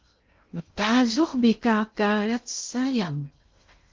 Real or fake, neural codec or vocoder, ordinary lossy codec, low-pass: fake; codec, 16 kHz in and 24 kHz out, 0.6 kbps, FocalCodec, streaming, 2048 codes; Opus, 32 kbps; 7.2 kHz